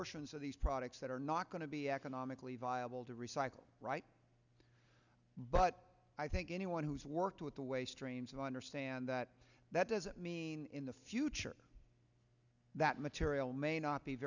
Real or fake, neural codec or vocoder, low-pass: real; none; 7.2 kHz